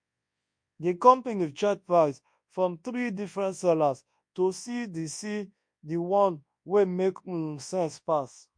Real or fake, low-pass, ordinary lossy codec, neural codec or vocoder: fake; 9.9 kHz; MP3, 48 kbps; codec, 24 kHz, 0.9 kbps, WavTokenizer, large speech release